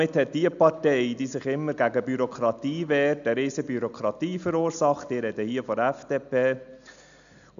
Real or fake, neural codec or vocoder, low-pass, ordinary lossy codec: real; none; 7.2 kHz; none